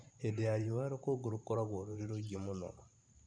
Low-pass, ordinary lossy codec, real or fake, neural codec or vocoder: 9.9 kHz; none; fake; vocoder, 44.1 kHz, 128 mel bands every 512 samples, BigVGAN v2